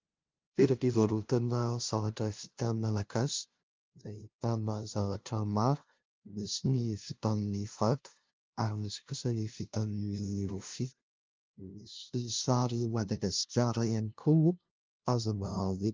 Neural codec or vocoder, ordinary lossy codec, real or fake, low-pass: codec, 16 kHz, 0.5 kbps, FunCodec, trained on LibriTTS, 25 frames a second; Opus, 32 kbps; fake; 7.2 kHz